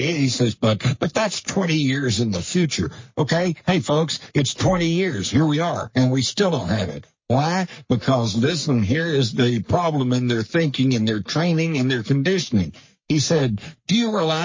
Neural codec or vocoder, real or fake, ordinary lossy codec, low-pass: codec, 44.1 kHz, 3.4 kbps, Pupu-Codec; fake; MP3, 32 kbps; 7.2 kHz